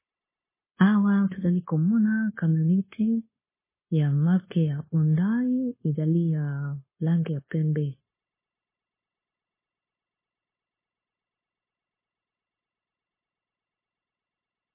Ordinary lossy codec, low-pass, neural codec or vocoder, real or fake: MP3, 16 kbps; 3.6 kHz; codec, 16 kHz, 0.9 kbps, LongCat-Audio-Codec; fake